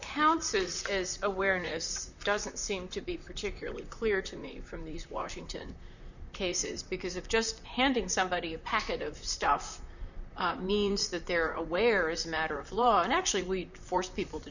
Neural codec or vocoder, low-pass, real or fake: vocoder, 44.1 kHz, 128 mel bands, Pupu-Vocoder; 7.2 kHz; fake